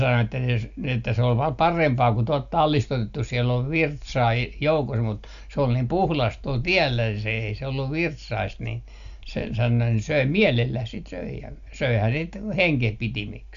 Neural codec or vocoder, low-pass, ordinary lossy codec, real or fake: none; 7.2 kHz; MP3, 96 kbps; real